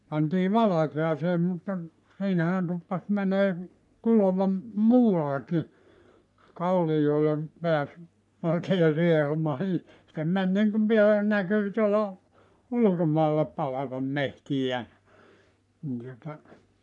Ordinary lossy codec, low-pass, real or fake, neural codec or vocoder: none; 10.8 kHz; fake; codec, 44.1 kHz, 3.4 kbps, Pupu-Codec